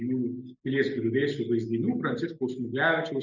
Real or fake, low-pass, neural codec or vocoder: real; 7.2 kHz; none